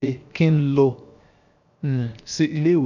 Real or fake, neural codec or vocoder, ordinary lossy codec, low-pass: fake; codec, 16 kHz, 0.7 kbps, FocalCodec; none; 7.2 kHz